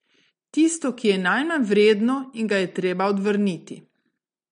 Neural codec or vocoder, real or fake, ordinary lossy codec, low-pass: none; real; MP3, 48 kbps; 9.9 kHz